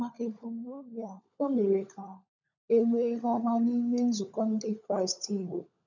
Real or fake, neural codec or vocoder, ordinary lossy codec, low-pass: fake; codec, 16 kHz, 16 kbps, FunCodec, trained on LibriTTS, 50 frames a second; none; 7.2 kHz